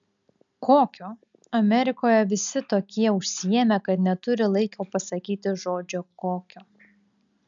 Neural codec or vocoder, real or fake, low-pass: none; real; 7.2 kHz